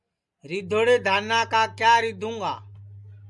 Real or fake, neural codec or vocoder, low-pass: real; none; 10.8 kHz